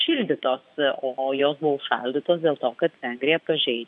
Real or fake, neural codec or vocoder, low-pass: fake; vocoder, 24 kHz, 100 mel bands, Vocos; 10.8 kHz